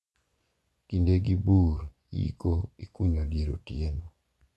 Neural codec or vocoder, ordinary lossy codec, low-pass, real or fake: vocoder, 24 kHz, 100 mel bands, Vocos; none; none; fake